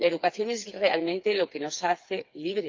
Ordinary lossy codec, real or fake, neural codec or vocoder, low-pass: Opus, 24 kbps; fake; codec, 16 kHz, 4 kbps, FreqCodec, smaller model; 7.2 kHz